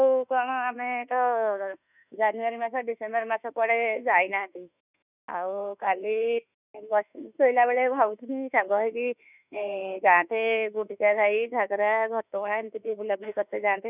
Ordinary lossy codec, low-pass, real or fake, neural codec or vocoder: none; 3.6 kHz; fake; autoencoder, 48 kHz, 32 numbers a frame, DAC-VAE, trained on Japanese speech